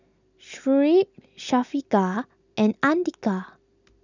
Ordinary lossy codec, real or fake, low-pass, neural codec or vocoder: none; real; 7.2 kHz; none